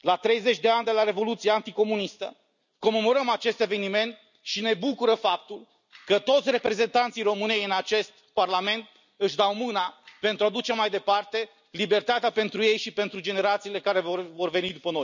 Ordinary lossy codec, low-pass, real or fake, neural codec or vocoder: none; 7.2 kHz; real; none